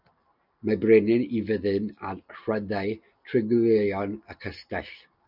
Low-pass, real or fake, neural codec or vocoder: 5.4 kHz; real; none